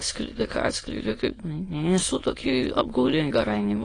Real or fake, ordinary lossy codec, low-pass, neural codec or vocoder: fake; AAC, 32 kbps; 9.9 kHz; autoencoder, 22.05 kHz, a latent of 192 numbers a frame, VITS, trained on many speakers